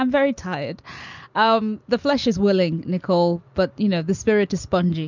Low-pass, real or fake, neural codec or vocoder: 7.2 kHz; real; none